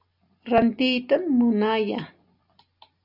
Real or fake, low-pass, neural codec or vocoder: real; 5.4 kHz; none